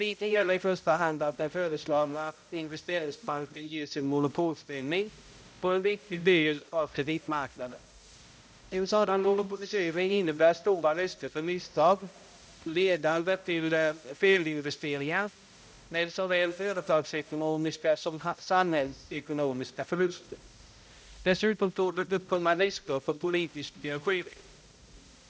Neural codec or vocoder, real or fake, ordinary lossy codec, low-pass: codec, 16 kHz, 0.5 kbps, X-Codec, HuBERT features, trained on balanced general audio; fake; none; none